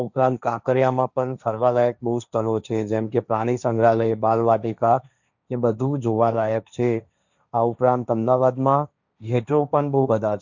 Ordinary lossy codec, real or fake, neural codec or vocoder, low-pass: none; fake; codec, 16 kHz, 1.1 kbps, Voila-Tokenizer; 7.2 kHz